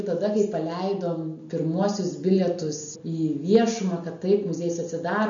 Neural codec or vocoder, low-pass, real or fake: none; 7.2 kHz; real